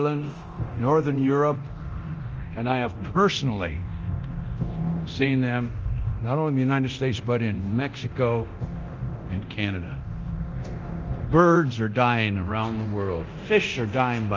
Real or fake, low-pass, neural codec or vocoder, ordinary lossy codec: fake; 7.2 kHz; codec, 24 kHz, 0.9 kbps, DualCodec; Opus, 24 kbps